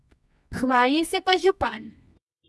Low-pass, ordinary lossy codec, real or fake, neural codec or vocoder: none; none; fake; codec, 24 kHz, 0.9 kbps, WavTokenizer, medium music audio release